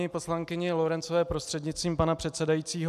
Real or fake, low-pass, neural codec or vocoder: real; 14.4 kHz; none